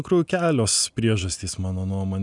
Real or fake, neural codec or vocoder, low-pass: real; none; 10.8 kHz